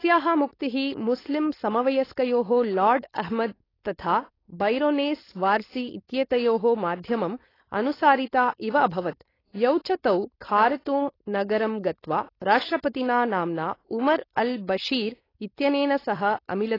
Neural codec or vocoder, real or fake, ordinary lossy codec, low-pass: codec, 16 kHz, 4.8 kbps, FACodec; fake; AAC, 24 kbps; 5.4 kHz